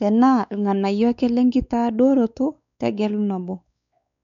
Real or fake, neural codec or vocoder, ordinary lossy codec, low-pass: fake; codec, 16 kHz, 4 kbps, X-Codec, WavLM features, trained on Multilingual LibriSpeech; none; 7.2 kHz